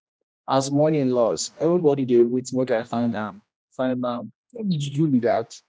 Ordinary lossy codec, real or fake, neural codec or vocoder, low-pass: none; fake; codec, 16 kHz, 1 kbps, X-Codec, HuBERT features, trained on general audio; none